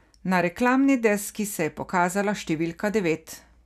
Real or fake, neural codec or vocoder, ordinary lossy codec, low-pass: real; none; none; 14.4 kHz